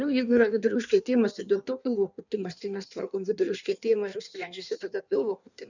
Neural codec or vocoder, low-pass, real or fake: codec, 16 kHz in and 24 kHz out, 1.1 kbps, FireRedTTS-2 codec; 7.2 kHz; fake